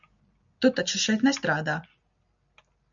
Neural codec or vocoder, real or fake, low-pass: none; real; 7.2 kHz